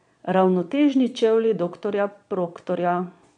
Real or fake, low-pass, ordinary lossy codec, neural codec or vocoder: fake; 9.9 kHz; none; vocoder, 22.05 kHz, 80 mel bands, Vocos